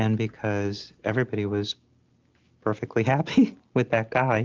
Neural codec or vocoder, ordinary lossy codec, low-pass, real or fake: none; Opus, 32 kbps; 7.2 kHz; real